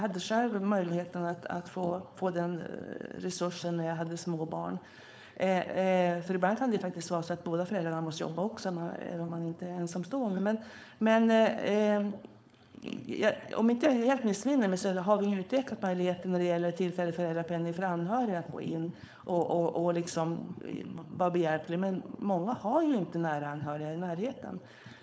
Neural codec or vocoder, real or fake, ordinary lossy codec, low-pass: codec, 16 kHz, 4.8 kbps, FACodec; fake; none; none